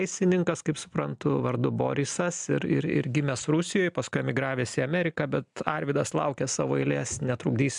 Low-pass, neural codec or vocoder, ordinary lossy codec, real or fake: 10.8 kHz; none; MP3, 96 kbps; real